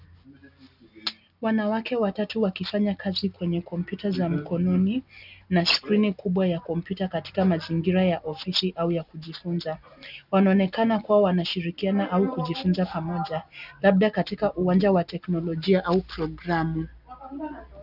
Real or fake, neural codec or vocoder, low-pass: real; none; 5.4 kHz